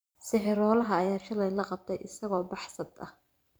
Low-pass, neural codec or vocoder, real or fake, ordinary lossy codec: none; vocoder, 44.1 kHz, 128 mel bands every 512 samples, BigVGAN v2; fake; none